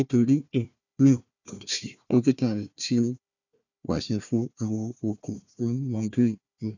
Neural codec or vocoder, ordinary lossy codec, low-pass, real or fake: codec, 16 kHz, 1 kbps, FunCodec, trained on Chinese and English, 50 frames a second; none; 7.2 kHz; fake